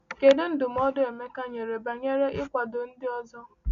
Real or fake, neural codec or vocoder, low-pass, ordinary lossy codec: real; none; 7.2 kHz; none